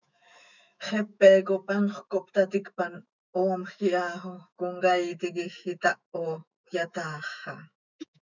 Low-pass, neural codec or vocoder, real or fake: 7.2 kHz; autoencoder, 48 kHz, 128 numbers a frame, DAC-VAE, trained on Japanese speech; fake